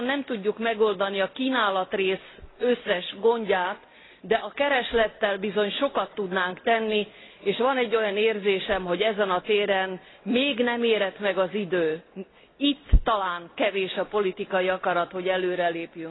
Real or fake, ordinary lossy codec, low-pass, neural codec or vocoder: real; AAC, 16 kbps; 7.2 kHz; none